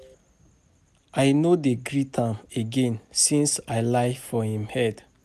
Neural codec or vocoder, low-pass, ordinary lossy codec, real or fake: none; 14.4 kHz; none; real